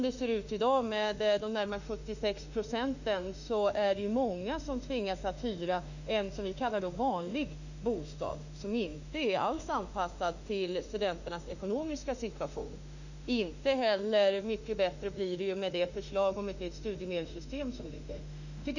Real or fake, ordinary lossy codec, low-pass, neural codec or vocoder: fake; none; 7.2 kHz; autoencoder, 48 kHz, 32 numbers a frame, DAC-VAE, trained on Japanese speech